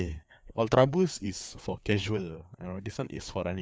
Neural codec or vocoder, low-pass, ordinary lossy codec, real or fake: codec, 16 kHz, 4 kbps, FreqCodec, larger model; none; none; fake